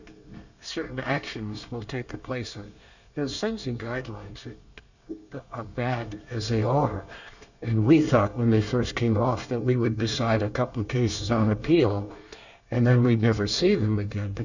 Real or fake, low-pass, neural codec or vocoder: fake; 7.2 kHz; codec, 24 kHz, 1 kbps, SNAC